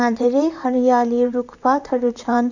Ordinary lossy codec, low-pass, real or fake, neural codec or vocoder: none; 7.2 kHz; fake; vocoder, 44.1 kHz, 128 mel bands, Pupu-Vocoder